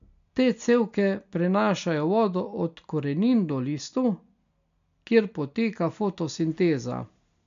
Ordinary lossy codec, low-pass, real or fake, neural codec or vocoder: MP3, 64 kbps; 7.2 kHz; real; none